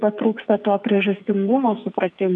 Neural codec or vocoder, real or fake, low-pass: codec, 44.1 kHz, 2.6 kbps, SNAC; fake; 10.8 kHz